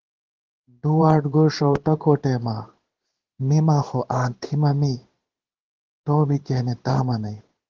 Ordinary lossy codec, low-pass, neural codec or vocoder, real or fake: Opus, 16 kbps; 7.2 kHz; codec, 16 kHz in and 24 kHz out, 1 kbps, XY-Tokenizer; fake